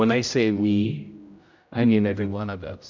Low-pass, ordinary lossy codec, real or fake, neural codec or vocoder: 7.2 kHz; MP3, 64 kbps; fake; codec, 16 kHz, 0.5 kbps, X-Codec, HuBERT features, trained on general audio